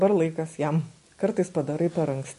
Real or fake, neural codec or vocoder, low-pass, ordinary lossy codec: real; none; 10.8 kHz; MP3, 48 kbps